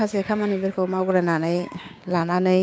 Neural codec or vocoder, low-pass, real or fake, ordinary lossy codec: codec, 16 kHz, 6 kbps, DAC; none; fake; none